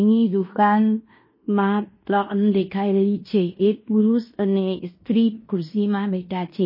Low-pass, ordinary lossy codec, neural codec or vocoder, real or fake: 5.4 kHz; MP3, 24 kbps; codec, 16 kHz in and 24 kHz out, 0.9 kbps, LongCat-Audio-Codec, fine tuned four codebook decoder; fake